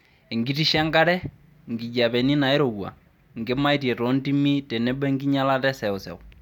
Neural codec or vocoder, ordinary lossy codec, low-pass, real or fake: none; none; 19.8 kHz; real